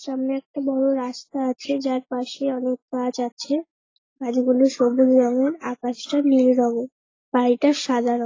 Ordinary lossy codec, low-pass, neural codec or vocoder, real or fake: AAC, 32 kbps; 7.2 kHz; none; real